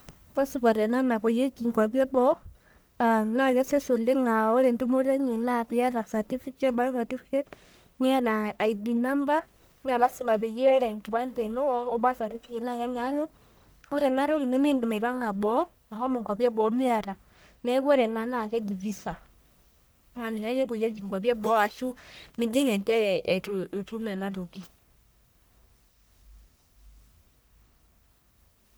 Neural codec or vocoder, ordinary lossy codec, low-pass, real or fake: codec, 44.1 kHz, 1.7 kbps, Pupu-Codec; none; none; fake